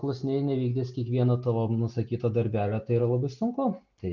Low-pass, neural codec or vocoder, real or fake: 7.2 kHz; none; real